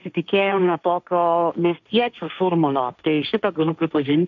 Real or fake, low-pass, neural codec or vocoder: fake; 7.2 kHz; codec, 16 kHz, 1.1 kbps, Voila-Tokenizer